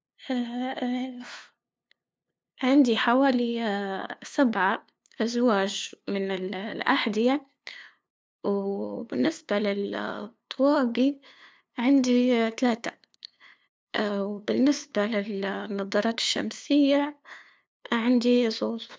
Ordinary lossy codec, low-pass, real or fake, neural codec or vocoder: none; none; fake; codec, 16 kHz, 2 kbps, FunCodec, trained on LibriTTS, 25 frames a second